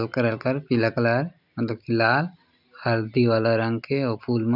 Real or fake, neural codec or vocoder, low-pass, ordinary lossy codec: real; none; 5.4 kHz; none